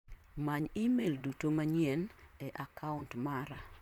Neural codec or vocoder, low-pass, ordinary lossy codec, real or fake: vocoder, 44.1 kHz, 128 mel bands, Pupu-Vocoder; 19.8 kHz; none; fake